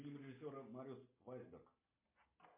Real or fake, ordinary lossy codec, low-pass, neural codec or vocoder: real; MP3, 32 kbps; 3.6 kHz; none